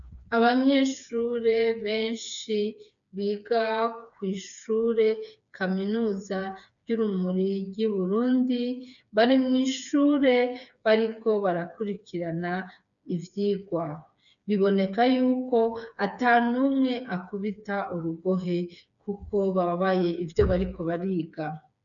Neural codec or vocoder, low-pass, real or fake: codec, 16 kHz, 4 kbps, FreqCodec, smaller model; 7.2 kHz; fake